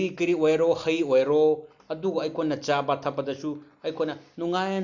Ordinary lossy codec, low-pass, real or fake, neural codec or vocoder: none; 7.2 kHz; real; none